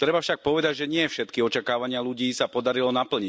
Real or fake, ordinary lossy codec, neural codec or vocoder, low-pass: real; none; none; none